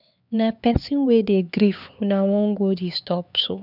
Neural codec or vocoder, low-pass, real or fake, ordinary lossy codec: codec, 16 kHz, 4 kbps, X-Codec, HuBERT features, trained on LibriSpeech; 5.4 kHz; fake; none